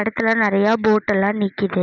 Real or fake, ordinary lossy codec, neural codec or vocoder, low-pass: real; none; none; 7.2 kHz